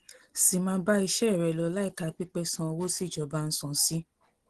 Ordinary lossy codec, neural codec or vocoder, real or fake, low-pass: Opus, 16 kbps; none; real; 10.8 kHz